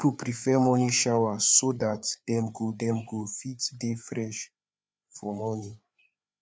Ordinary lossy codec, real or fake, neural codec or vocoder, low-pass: none; fake; codec, 16 kHz, 4 kbps, FreqCodec, larger model; none